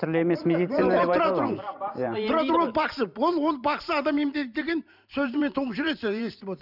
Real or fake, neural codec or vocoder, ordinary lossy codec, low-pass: fake; vocoder, 44.1 kHz, 128 mel bands every 512 samples, BigVGAN v2; none; 5.4 kHz